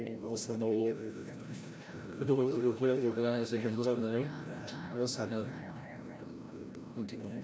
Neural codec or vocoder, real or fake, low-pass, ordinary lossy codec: codec, 16 kHz, 0.5 kbps, FreqCodec, larger model; fake; none; none